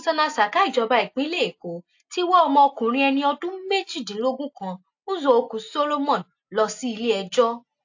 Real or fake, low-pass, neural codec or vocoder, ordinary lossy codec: real; 7.2 kHz; none; AAC, 48 kbps